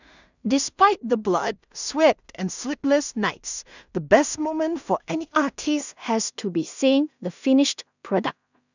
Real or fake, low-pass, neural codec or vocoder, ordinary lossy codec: fake; 7.2 kHz; codec, 16 kHz in and 24 kHz out, 0.4 kbps, LongCat-Audio-Codec, two codebook decoder; none